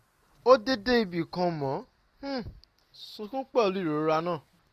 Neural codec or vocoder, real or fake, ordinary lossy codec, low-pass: none; real; none; 14.4 kHz